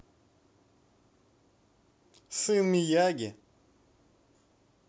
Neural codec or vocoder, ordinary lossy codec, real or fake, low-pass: none; none; real; none